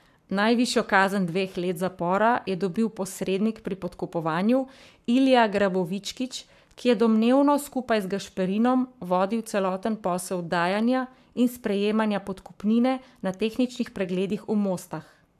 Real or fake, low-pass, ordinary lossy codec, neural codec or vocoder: fake; 14.4 kHz; AAC, 96 kbps; codec, 44.1 kHz, 7.8 kbps, Pupu-Codec